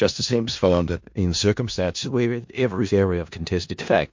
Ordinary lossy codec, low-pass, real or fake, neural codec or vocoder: MP3, 48 kbps; 7.2 kHz; fake; codec, 16 kHz in and 24 kHz out, 0.4 kbps, LongCat-Audio-Codec, four codebook decoder